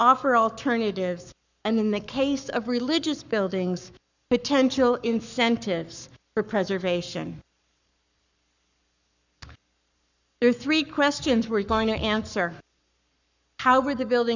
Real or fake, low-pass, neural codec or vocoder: fake; 7.2 kHz; codec, 44.1 kHz, 7.8 kbps, Pupu-Codec